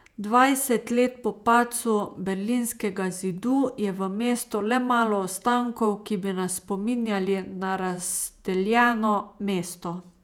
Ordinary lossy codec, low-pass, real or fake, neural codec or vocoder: none; 19.8 kHz; fake; vocoder, 48 kHz, 128 mel bands, Vocos